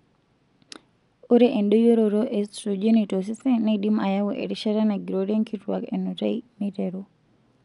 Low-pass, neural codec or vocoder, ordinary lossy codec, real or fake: 10.8 kHz; none; none; real